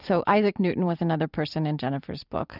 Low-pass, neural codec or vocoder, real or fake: 5.4 kHz; vocoder, 44.1 kHz, 128 mel bands every 256 samples, BigVGAN v2; fake